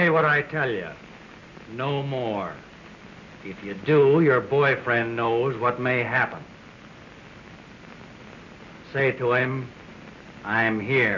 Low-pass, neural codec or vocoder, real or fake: 7.2 kHz; none; real